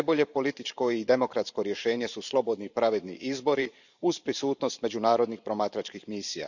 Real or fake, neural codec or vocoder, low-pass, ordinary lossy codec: fake; vocoder, 44.1 kHz, 128 mel bands every 512 samples, BigVGAN v2; 7.2 kHz; none